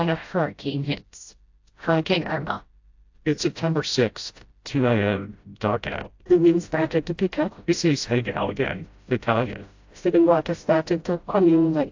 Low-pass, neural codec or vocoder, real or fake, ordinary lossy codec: 7.2 kHz; codec, 16 kHz, 0.5 kbps, FreqCodec, smaller model; fake; AAC, 48 kbps